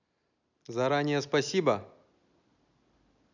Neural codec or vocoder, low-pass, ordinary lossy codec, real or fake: none; 7.2 kHz; none; real